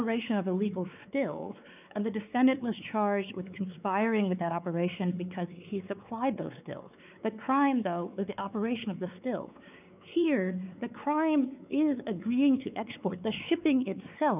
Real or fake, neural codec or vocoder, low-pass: fake; codec, 16 kHz, 4 kbps, X-Codec, WavLM features, trained on Multilingual LibriSpeech; 3.6 kHz